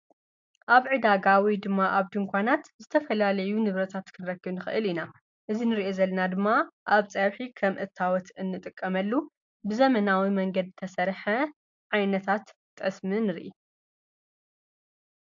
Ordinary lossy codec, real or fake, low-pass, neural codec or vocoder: AAC, 64 kbps; real; 7.2 kHz; none